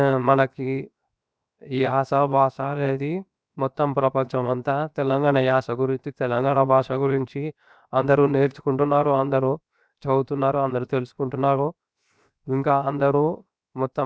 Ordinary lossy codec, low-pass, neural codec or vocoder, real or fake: none; none; codec, 16 kHz, 0.7 kbps, FocalCodec; fake